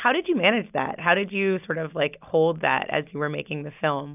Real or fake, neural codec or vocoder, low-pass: real; none; 3.6 kHz